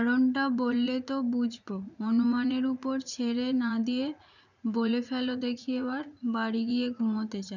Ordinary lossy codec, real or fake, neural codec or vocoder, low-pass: none; fake; vocoder, 44.1 kHz, 80 mel bands, Vocos; 7.2 kHz